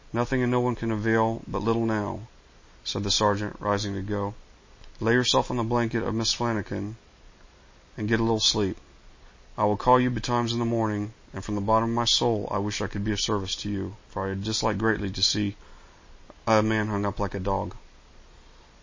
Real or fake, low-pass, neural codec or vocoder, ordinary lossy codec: real; 7.2 kHz; none; MP3, 32 kbps